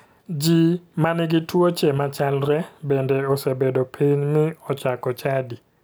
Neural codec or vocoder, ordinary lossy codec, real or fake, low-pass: none; none; real; none